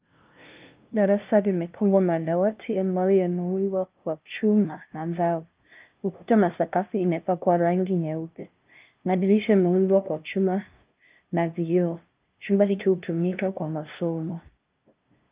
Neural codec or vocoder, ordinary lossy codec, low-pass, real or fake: codec, 16 kHz, 0.5 kbps, FunCodec, trained on LibriTTS, 25 frames a second; Opus, 32 kbps; 3.6 kHz; fake